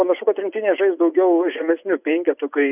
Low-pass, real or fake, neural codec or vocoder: 3.6 kHz; fake; vocoder, 24 kHz, 100 mel bands, Vocos